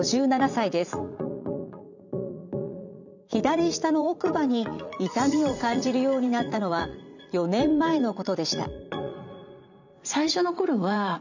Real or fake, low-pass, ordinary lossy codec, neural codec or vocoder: fake; 7.2 kHz; none; vocoder, 44.1 kHz, 80 mel bands, Vocos